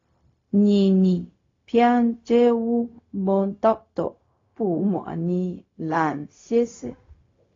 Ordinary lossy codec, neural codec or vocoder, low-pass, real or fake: AAC, 32 kbps; codec, 16 kHz, 0.4 kbps, LongCat-Audio-Codec; 7.2 kHz; fake